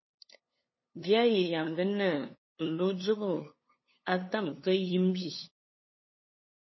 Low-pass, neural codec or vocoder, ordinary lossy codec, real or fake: 7.2 kHz; codec, 16 kHz, 2 kbps, FunCodec, trained on LibriTTS, 25 frames a second; MP3, 24 kbps; fake